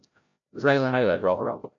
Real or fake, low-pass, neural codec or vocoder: fake; 7.2 kHz; codec, 16 kHz, 0.5 kbps, FreqCodec, larger model